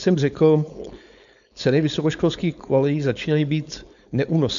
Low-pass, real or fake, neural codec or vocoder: 7.2 kHz; fake; codec, 16 kHz, 4.8 kbps, FACodec